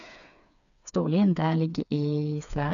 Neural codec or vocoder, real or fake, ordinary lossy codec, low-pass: codec, 16 kHz, 4 kbps, FreqCodec, smaller model; fake; none; 7.2 kHz